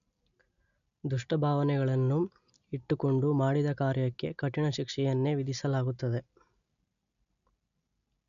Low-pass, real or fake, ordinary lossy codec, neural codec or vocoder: 7.2 kHz; real; none; none